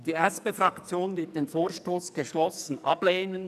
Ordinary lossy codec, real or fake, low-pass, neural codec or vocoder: none; fake; 14.4 kHz; codec, 44.1 kHz, 2.6 kbps, SNAC